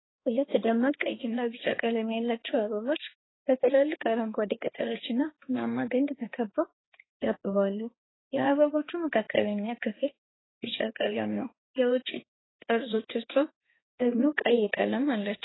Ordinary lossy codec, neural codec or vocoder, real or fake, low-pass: AAC, 16 kbps; codec, 16 kHz, 2 kbps, X-Codec, HuBERT features, trained on balanced general audio; fake; 7.2 kHz